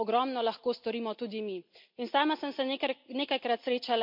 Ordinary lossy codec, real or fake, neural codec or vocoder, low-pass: MP3, 48 kbps; real; none; 5.4 kHz